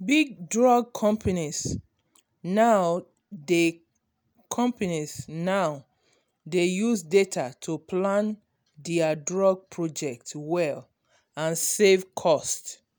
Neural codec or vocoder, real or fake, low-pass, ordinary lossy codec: none; real; none; none